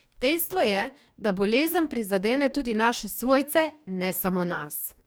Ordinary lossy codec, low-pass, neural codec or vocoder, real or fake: none; none; codec, 44.1 kHz, 2.6 kbps, DAC; fake